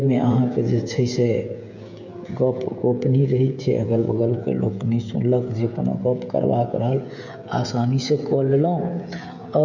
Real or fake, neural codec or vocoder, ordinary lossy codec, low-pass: fake; codec, 16 kHz, 16 kbps, FreqCodec, smaller model; none; 7.2 kHz